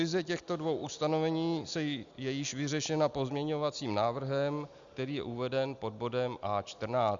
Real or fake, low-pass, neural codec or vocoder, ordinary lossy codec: real; 7.2 kHz; none; Opus, 64 kbps